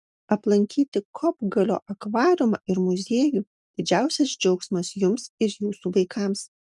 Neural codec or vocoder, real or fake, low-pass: none; real; 10.8 kHz